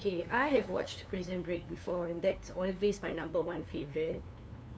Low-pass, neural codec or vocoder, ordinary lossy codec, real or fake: none; codec, 16 kHz, 2 kbps, FunCodec, trained on LibriTTS, 25 frames a second; none; fake